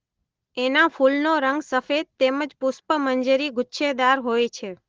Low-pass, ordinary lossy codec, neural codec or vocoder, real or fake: 7.2 kHz; Opus, 16 kbps; none; real